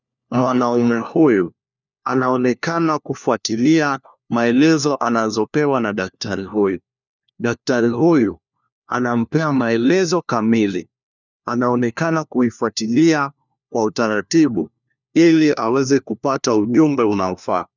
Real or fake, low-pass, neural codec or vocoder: fake; 7.2 kHz; codec, 16 kHz, 1 kbps, FunCodec, trained on LibriTTS, 50 frames a second